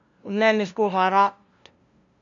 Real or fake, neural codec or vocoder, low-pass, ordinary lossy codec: fake; codec, 16 kHz, 0.5 kbps, FunCodec, trained on LibriTTS, 25 frames a second; 7.2 kHz; MP3, 64 kbps